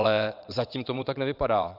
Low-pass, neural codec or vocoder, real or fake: 5.4 kHz; vocoder, 22.05 kHz, 80 mel bands, WaveNeXt; fake